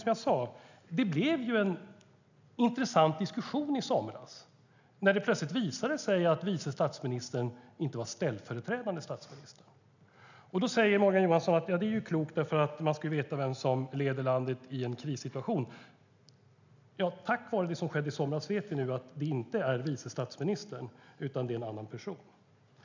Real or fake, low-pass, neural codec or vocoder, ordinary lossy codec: real; 7.2 kHz; none; none